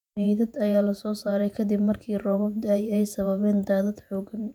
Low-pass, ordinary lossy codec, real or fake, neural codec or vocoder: 19.8 kHz; none; fake; vocoder, 48 kHz, 128 mel bands, Vocos